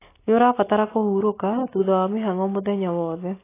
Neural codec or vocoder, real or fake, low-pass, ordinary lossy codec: none; real; 3.6 kHz; AAC, 16 kbps